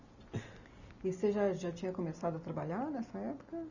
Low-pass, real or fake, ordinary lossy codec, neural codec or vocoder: 7.2 kHz; real; none; none